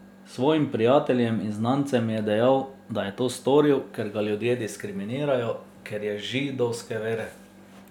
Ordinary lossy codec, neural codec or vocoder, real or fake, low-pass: none; none; real; 19.8 kHz